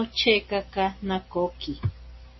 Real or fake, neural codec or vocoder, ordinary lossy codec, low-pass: real; none; MP3, 24 kbps; 7.2 kHz